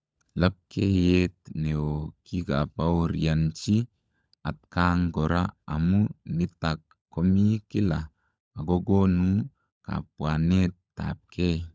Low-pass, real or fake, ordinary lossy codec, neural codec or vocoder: none; fake; none; codec, 16 kHz, 16 kbps, FunCodec, trained on LibriTTS, 50 frames a second